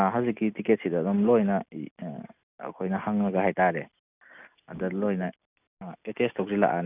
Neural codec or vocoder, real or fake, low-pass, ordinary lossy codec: none; real; 3.6 kHz; none